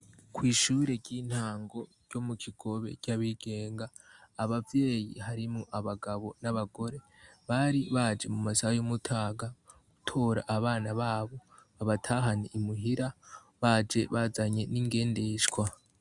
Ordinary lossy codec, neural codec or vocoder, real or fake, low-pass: Opus, 64 kbps; none; real; 10.8 kHz